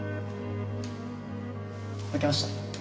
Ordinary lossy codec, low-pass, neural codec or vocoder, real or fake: none; none; none; real